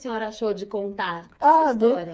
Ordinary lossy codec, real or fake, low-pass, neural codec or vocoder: none; fake; none; codec, 16 kHz, 4 kbps, FreqCodec, smaller model